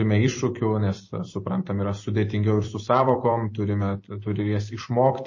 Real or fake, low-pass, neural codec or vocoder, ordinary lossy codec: fake; 7.2 kHz; vocoder, 44.1 kHz, 128 mel bands every 256 samples, BigVGAN v2; MP3, 32 kbps